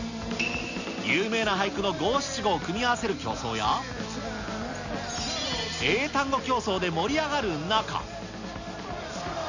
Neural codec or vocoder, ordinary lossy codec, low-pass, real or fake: none; none; 7.2 kHz; real